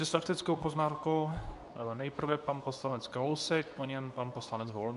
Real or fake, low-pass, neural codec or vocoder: fake; 10.8 kHz; codec, 24 kHz, 0.9 kbps, WavTokenizer, medium speech release version 2